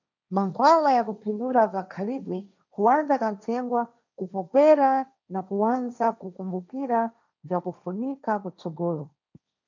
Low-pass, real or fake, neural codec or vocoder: 7.2 kHz; fake; codec, 16 kHz, 1.1 kbps, Voila-Tokenizer